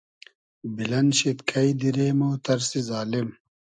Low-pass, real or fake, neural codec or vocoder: 9.9 kHz; real; none